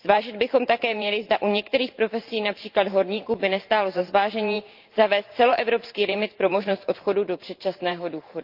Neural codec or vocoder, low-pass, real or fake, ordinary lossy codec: vocoder, 44.1 kHz, 128 mel bands every 512 samples, BigVGAN v2; 5.4 kHz; fake; Opus, 24 kbps